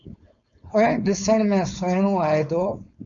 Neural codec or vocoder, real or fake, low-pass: codec, 16 kHz, 4.8 kbps, FACodec; fake; 7.2 kHz